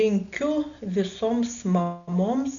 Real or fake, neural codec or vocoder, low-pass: real; none; 7.2 kHz